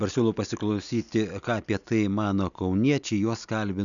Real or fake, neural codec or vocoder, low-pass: real; none; 7.2 kHz